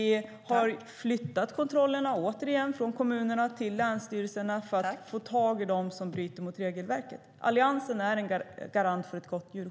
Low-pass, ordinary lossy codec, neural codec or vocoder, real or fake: none; none; none; real